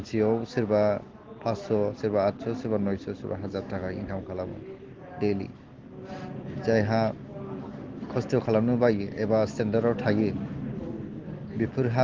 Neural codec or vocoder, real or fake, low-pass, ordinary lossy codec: none; real; 7.2 kHz; Opus, 16 kbps